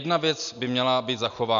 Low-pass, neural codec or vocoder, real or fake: 7.2 kHz; none; real